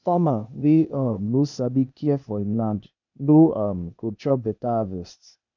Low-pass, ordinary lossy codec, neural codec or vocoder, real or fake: 7.2 kHz; none; codec, 16 kHz, 0.8 kbps, ZipCodec; fake